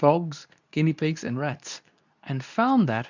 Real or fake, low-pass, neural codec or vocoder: fake; 7.2 kHz; codec, 24 kHz, 0.9 kbps, WavTokenizer, medium speech release version 2